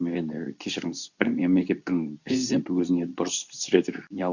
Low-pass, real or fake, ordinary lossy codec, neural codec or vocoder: 7.2 kHz; fake; none; codec, 24 kHz, 0.9 kbps, WavTokenizer, medium speech release version 2